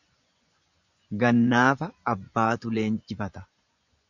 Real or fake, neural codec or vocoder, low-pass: fake; vocoder, 24 kHz, 100 mel bands, Vocos; 7.2 kHz